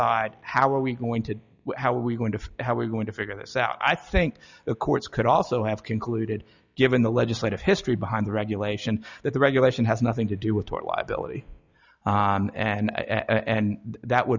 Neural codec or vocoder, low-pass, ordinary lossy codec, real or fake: none; 7.2 kHz; Opus, 64 kbps; real